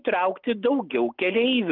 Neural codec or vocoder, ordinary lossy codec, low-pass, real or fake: none; Opus, 32 kbps; 5.4 kHz; real